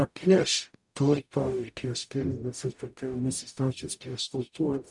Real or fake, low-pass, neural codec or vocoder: fake; 10.8 kHz; codec, 44.1 kHz, 0.9 kbps, DAC